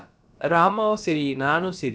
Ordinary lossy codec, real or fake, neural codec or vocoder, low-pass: none; fake; codec, 16 kHz, about 1 kbps, DyCAST, with the encoder's durations; none